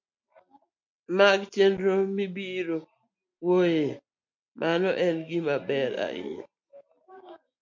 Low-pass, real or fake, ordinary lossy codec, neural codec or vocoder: 7.2 kHz; real; MP3, 64 kbps; none